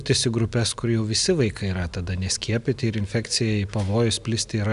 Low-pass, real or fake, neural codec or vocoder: 10.8 kHz; real; none